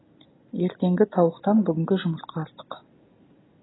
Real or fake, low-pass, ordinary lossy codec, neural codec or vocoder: real; 7.2 kHz; AAC, 16 kbps; none